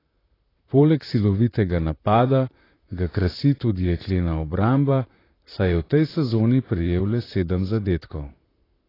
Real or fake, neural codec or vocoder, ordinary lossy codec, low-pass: fake; vocoder, 44.1 kHz, 128 mel bands, Pupu-Vocoder; AAC, 24 kbps; 5.4 kHz